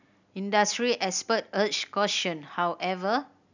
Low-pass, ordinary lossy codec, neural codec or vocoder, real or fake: 7.2 kHz; none; none; real